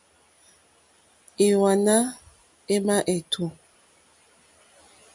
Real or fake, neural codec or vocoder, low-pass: real; none; 10.8 kHz